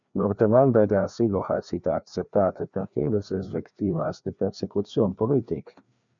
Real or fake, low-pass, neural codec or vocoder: fake; 7.2 kHz; codec, 16 kHz, 2 kbps, FreqCodec, larger model